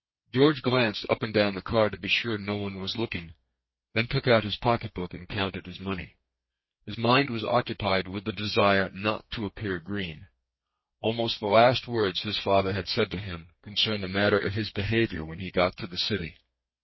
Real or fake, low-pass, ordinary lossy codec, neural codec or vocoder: fake; 7.2 kHz; MP3, 24 kbps; codec, 32 kHz, 1.9 kbps, SNAC